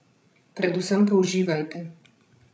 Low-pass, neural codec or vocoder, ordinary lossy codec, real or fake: none; codec, 16 kHz, 16 kbps, FreqCodec, larger model; none; fake